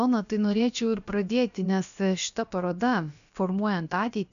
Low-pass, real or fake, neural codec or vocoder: 7.2 kHz; fake; codec, 16 kHz, about 1 kbps, DyCAST, with the encoder's durations